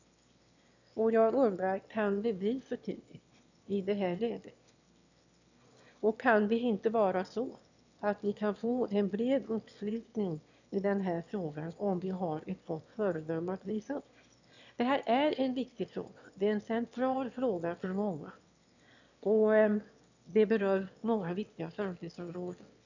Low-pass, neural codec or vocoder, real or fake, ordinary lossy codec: 7.2 kHz; autoencoder, 22.05 kHz, a latent of 192 numbers a frame, VITS, trained on one speaker; fake; none